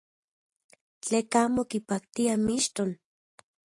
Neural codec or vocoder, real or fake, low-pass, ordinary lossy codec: none; real; 10.8 kHz; AAC, 48 kbps